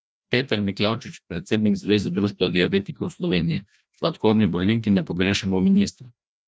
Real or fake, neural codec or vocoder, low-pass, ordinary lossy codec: fake; codec, 16 kHz, 1 kbps, FreqCodec, larger model; none; none